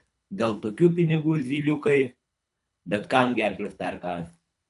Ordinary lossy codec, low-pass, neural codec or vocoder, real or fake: AAC, 96 kbps; 10.8 kHz; codec, 24 kHz, 3 kbps, HILCodec; fake